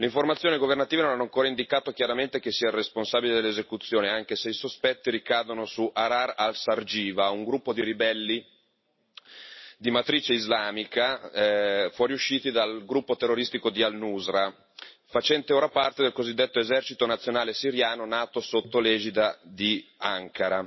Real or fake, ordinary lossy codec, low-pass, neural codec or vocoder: real; MP3, 24 kbps; 7.2 kHz; none